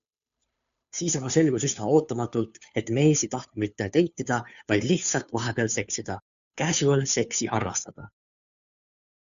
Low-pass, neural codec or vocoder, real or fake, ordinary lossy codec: 7.2 kHz; codec, 16 kHz, 2 kbps, FunCodec, trained on Chinese and English, 25 frames a second; fake; MP3, 64 kbps